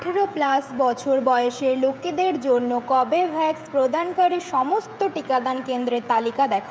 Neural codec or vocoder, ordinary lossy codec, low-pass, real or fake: codec, 16 kHz, 16 kbps, FreqCodec, smaller model; none; none; fake